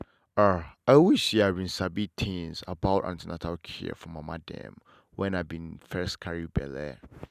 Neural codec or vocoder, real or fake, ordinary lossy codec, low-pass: none; real; none; 14.4 kHz